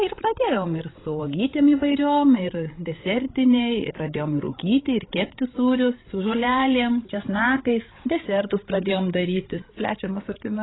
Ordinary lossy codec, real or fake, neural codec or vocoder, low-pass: AAC, 16 kbps; fake; codec, 16 kHz, 16 kbps, FreqCodec, larger model; 7.2 kHz